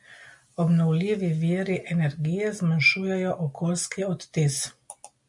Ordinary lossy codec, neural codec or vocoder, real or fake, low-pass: MP3, 64 kbps; none; real; 10.8 kHz